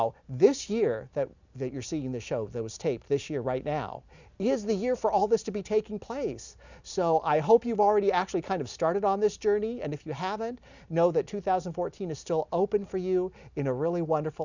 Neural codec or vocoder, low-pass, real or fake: none; 7.2 kHz; real